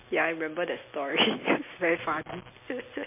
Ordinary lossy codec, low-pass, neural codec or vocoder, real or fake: MP3, 24 kbps; 3.6 kHz; none; real